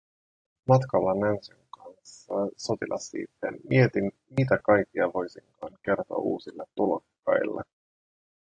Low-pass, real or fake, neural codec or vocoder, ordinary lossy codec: 9.9 kHz; real; none; AAC, 48 kbps